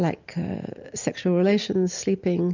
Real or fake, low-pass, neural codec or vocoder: real; 7.2 kHz; none